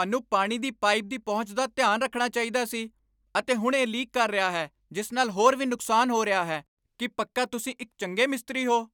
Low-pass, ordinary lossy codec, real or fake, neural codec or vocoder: 19.8 kHz; none; real; none